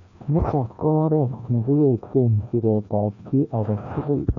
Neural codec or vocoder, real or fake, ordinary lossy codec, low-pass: codec, 16 kHz, 1 kbps, FreqCodec, larger model; fake; MP3, 64 kbps; 7.2 kHz